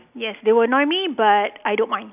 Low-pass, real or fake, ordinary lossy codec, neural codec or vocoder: 3.6 kHz; real; none; none